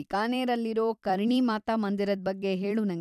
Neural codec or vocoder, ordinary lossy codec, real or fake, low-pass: vocoder, 44.1 kHz, 128 mel bands every 256 samples, BigVGAN v2; none; fake; 14.4 kHz